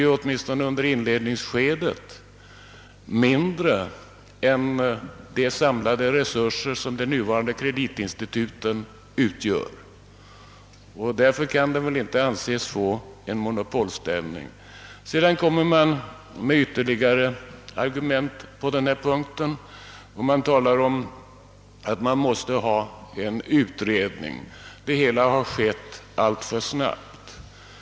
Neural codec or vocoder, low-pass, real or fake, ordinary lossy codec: none; none; real; none